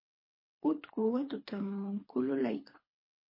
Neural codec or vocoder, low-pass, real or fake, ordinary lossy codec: codec, 24 kHz, 3 kbps, HILCodec; 5.4 kHz; fake; MP3, 24 kbps